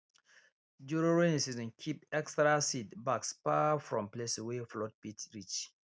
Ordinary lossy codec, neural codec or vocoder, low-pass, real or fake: none; none; none; real